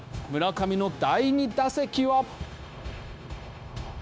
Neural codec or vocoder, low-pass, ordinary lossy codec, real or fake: codec, 16 kHz, 0.9 kbps, LongCat-Audio-Codec; none; none; fake